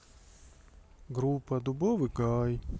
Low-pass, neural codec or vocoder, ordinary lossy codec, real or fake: none; none; none; real